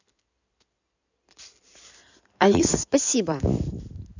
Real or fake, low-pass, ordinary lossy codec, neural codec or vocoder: fake; 7.2 kHz; none; codec, 16 kHz in and 24 kHz out, 2.2 kbps, FireRedTTS-2 codec